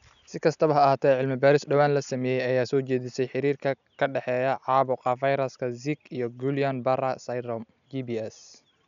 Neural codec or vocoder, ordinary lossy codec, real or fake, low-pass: none; none; real; 7.2 kHz